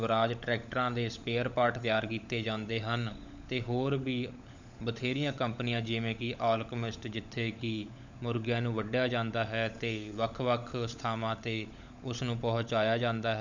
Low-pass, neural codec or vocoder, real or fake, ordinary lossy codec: 7.2 kHz; codec, 16 kHz, 16 kbps, FunCodec, trained on LibriTTS, 50 frames a second; fake; none